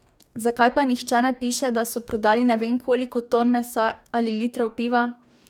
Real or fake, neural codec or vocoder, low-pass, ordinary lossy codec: fake; codec, 44.1 kHz, 2.6 kbps, DAC; 19.8 kHz; none